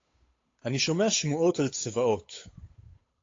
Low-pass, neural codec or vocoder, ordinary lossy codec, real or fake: 7.2 kHz; codec, 16 kHz, 8 kbps, FunCodec, trained on Chinese and English, 25 frames a second; AAC, 32 kbps; fake